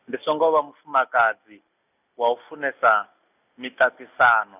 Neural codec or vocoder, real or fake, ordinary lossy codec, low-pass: none; real; none; 3.6 kHz